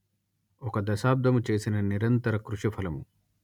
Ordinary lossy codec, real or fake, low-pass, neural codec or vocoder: none; real; 19.8 kHz; none